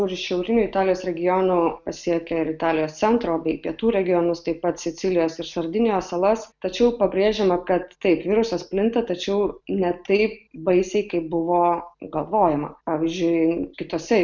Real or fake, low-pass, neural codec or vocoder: real; 7.2 kHz; none